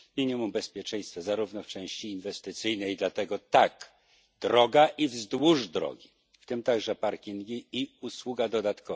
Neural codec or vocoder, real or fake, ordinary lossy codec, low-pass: none; real; none; none